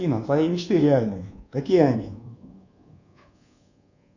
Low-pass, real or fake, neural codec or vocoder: 7.2 kHz; fake; codec, 24 kHz, 1.2 kbps, DualCodec